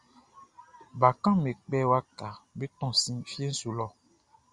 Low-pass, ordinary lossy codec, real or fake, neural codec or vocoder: 10.8 kHz; Opus, 64 kbps; real; none